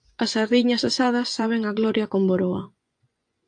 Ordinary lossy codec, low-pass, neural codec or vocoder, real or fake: AAC, 64 kbps; 9.9 kHz; vocoder, 24 kHz, 100 mel bands, Vocos; fake